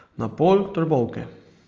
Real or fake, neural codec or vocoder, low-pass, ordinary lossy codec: real; none; 7.2 kHz; Opus, 24 kbps